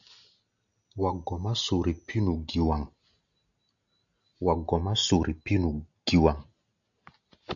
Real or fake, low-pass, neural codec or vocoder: real; 7.2 kHz; none